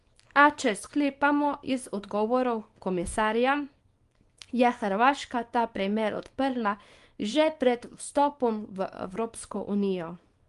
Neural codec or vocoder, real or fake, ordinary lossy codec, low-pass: codec, 24 kHz, 0.9 kbps, WavTokenizer, small release; fake; Opus, 32 kbps; 10.8 kHz